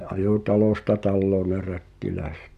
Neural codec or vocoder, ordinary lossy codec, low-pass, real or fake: none; none; 14.4 kHz; real